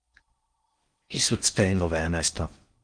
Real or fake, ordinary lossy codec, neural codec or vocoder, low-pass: fake; Opus, 32 kbps; codec, 16 kHz in and 24 kHz out, 0.6 kbps, FocalCodec, streaming, 4096 codes; 9.9 kHz